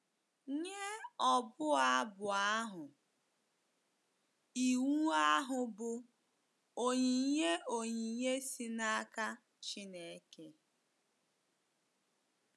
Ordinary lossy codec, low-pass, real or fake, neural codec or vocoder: none; none; real; none